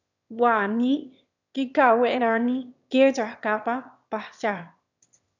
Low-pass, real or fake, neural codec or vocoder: 7.2 kHz; fake; autoencoder, 22.05 kHz, a latent of 192 numbers a frame, VITS, trained on one speaker